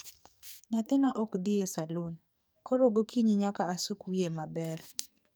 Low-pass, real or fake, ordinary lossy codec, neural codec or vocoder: none; fake; none; codec, 44.1 kHz, 2.6 kbps, SNAC